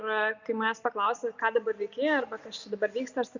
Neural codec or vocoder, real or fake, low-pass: none; real; 7.2 kHz